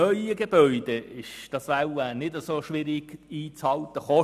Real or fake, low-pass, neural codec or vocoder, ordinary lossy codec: real; 14.4 kHz; none; none